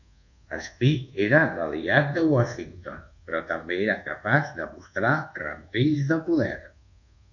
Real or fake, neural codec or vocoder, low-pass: fake; codec, 24 kHz, 1.2 kbps, DualCodec; 7.2 kHz